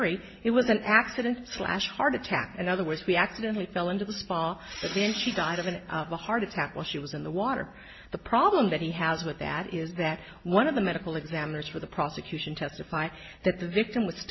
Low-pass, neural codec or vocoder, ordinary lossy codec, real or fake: 7.2 kHz; none; MP3, 24 kbps; real